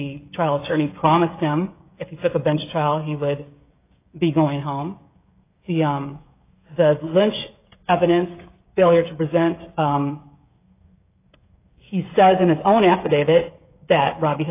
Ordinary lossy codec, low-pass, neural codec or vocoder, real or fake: AAC, 24 kbps; 3.6 kHz; codec, 16 kHz, 16 kbps, FreqCodec, smaller model; fake